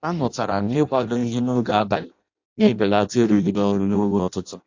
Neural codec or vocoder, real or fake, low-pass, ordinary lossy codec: codec, 16 kHz in and 24 kHz out, 0.6 kbps, FireRedTTS-2 codec; fake; 7.2 kHz; AAC, 48 kbps